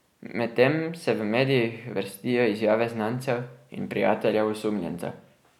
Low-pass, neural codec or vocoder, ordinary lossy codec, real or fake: 19.8 kHz; none; none; real